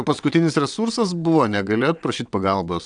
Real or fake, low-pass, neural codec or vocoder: fake; 9.9 kHz; vocoder, 22.05 kHz, 80 mel bands, WaveNeXt